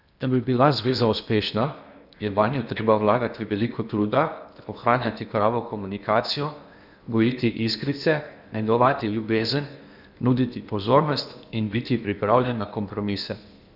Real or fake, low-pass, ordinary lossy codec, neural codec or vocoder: fake; 5.4 kHz; none; codec, 16 kHz in and 24 kHz out, 0.8 kbps, FocalCodec, streaming, 65536 codes